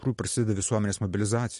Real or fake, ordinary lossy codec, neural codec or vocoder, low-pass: real; MP3, 48 kbps; none; 14.4 kHz